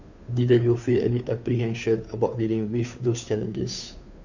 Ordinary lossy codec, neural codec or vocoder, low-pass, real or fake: none; codec, 16 kHz, 2 kbps, FunCodec, trained on Chinese and English, 25 frames a second; 7.2 kHz; fake